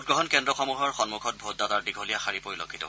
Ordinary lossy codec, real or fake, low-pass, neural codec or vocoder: none; real; none; none